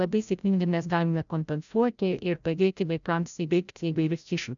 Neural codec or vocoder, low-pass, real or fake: codec, 16 kHz, 0.5 kbps, FreqCodec, larger model; 7.2 kHz; fake